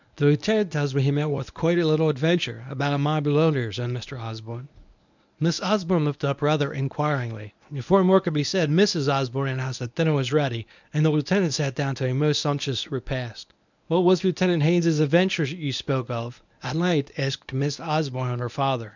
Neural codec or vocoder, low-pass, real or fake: codec, 24 kHz, 0.9 kbps, WavTokenizer, medium speech release version 1; 7.2 kHz; fake